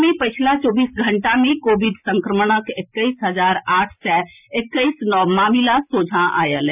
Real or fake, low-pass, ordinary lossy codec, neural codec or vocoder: real; 3.6 kHz; none; none